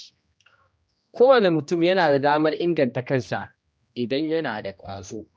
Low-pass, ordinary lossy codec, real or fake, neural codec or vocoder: none; none; fake; codec, 16 kHz, 1 kbps, X-Codec, HuBERT features, trained on general audio